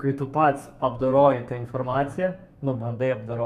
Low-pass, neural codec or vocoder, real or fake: 14.4 kHz; codec, 32 kHz, 1.9 kbps, SNAC; fake